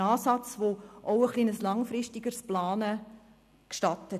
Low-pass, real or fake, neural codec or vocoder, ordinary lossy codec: 14.4 kHz; real; none; none